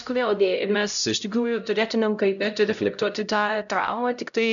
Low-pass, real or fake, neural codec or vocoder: 7.2 kHz; fake; codec, 16 kHz, 0.5 kbps, X-Codec, HuBERT features, trained on LibriSpeech